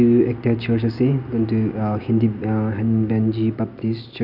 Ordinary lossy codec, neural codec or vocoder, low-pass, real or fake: none; none; 5.4 kHz; real